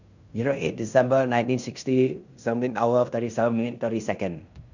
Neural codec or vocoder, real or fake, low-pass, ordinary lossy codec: codec, 16 kHz in and 24 kHz out, 0.9 kbps, LongCat-Audio-Codec, fine tuned four codebook decoder; fake; 7.2 kHz; none